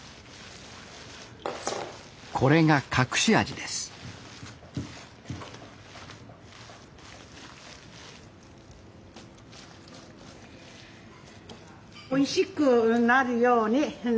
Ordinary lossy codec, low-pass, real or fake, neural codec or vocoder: none; none; real; none